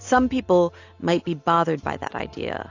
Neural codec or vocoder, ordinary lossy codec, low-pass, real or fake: none; AAC, 48 kbps; 7.2 kHz; real